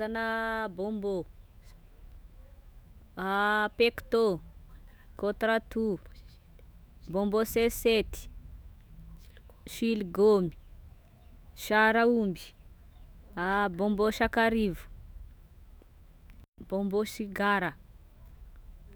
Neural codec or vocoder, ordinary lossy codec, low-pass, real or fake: autoencoder, 48 kHz, 128 numbers a frame, DAC-VAE, trained on Japanese speech; none; none; fake